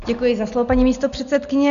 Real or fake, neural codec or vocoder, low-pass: real; none; 7.2 kHz